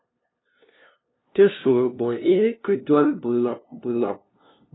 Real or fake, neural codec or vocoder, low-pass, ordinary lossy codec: fake; codec, 16 kHz, 0.5 kbps, FunCodec, trained on LibriTTS, 25 frames a second; 7.2 kHz; AAC, 16 kbps